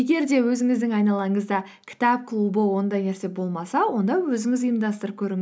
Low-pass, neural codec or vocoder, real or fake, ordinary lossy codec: none; none; real; none